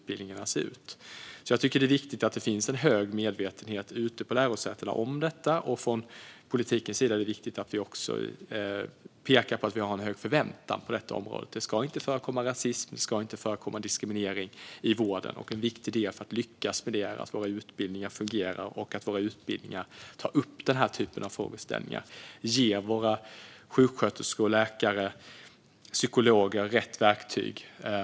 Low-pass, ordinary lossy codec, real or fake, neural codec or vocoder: none; none; real; none